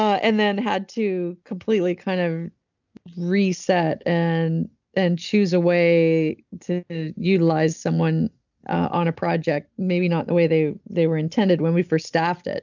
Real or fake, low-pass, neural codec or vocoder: real; 7.2 kHz; none